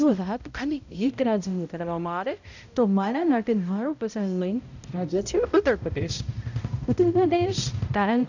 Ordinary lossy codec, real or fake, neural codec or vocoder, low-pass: none; fake; codec, 16 kHz, 0.5 kbps, X-Codec, HuBERT features, trained on balanced general audio; 7.2 kHz